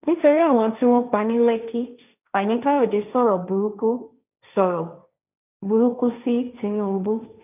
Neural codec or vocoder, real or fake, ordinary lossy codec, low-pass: codec, 16 kHz, 1.1 kbps, Voila-Tokenizer; fake; none; 3.6 kHz